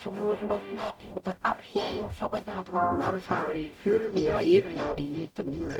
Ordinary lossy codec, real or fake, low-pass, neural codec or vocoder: none; fake; 19.8 kHz; codec, 44.1 kHz, 0.9 kbps, DAC